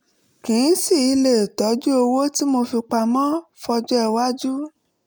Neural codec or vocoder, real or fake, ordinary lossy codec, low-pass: none; real; none; none